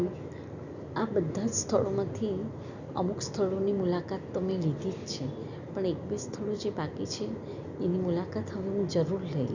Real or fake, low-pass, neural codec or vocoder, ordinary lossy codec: real; 7.2 kHz; none; none